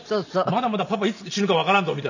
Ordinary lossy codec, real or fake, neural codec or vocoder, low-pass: none; real; none; 7.2 kHz